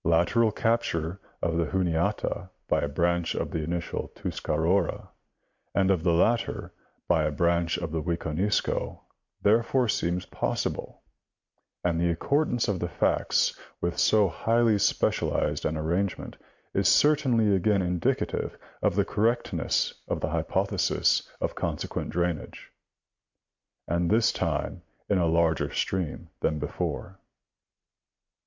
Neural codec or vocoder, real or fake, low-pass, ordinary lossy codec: none; real; 7.2 kHz; MP3, 64 kbps